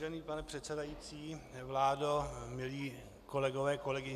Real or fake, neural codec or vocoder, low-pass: real; none; 14.4 kHz